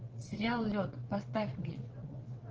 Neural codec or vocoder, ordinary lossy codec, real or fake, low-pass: vocoder, 22.05 kHz, 80 mel bands, WaveNeXt; Opus, 16 kbps; fake; 7.2 kHz